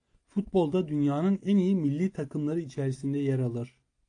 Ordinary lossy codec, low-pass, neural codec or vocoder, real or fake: AAC, 48 kbps; 9.9 kHz; none; real